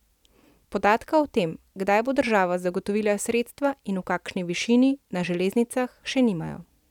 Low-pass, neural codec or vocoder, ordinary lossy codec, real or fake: 19.8 kHz; none; none; real